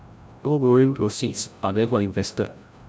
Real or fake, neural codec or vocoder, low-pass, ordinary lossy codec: fake; codec, 16 kHz, 0.5 kbps, FreqCodec, larger model; none; none